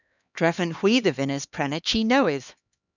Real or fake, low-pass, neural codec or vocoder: fake; 7.2 kHz; codec, 16 kHz, 2 kbps, X-Codec, HuBERT features, trained on LibriSpeech